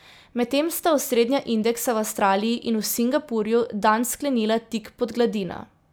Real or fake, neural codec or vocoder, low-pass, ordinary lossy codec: real; none; none; none